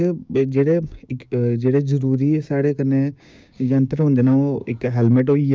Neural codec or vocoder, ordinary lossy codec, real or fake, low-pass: codec, 16 kHz, 6 kbps, DAC; none; fake; none